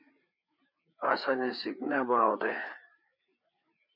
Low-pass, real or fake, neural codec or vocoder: 5.4 kHz; fake; codec, 16 kHz, 4 kbps, FreqCodec, larger model